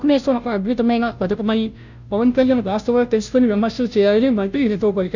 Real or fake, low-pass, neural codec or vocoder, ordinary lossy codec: fake; 7.2 kHz; codec, 16 kHz, 0.5 kbps, FunCodec, trained on Chinese and English, 25 frames a second; none